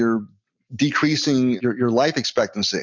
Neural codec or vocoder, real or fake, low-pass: none; real; 7.2 kHz